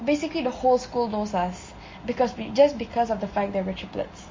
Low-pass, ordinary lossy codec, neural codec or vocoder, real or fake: 7.2 kHz; MP3, 32 kbps; none; real